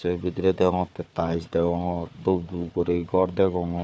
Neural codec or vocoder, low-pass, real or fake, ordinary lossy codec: codec, 16 kHz, 4 kbps, FunCodec, trained on Chinese and English, 50 frames a second; none; fake; none